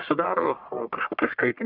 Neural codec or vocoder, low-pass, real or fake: codec, 44.1 kHz, 1.7 kbps, Pupu-Codec; 5.4 kHz; fake